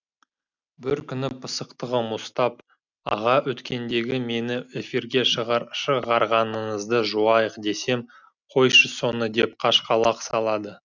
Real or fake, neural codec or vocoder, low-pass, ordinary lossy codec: real; none; 7.2 kHz; none